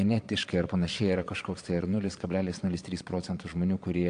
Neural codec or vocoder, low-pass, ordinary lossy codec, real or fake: vocoder, 22.05 kHz, 80 mel bands, Vocos; 9.9 kHz; AAC, 64 kbps; fake